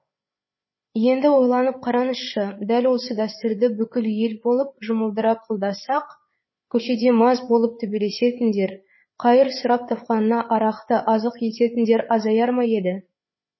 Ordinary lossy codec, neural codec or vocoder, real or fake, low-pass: MP3, 24 kbps; codec, 16 kHz, 8 kbps, FreqCodec, larger model; fake; 7.2 kHz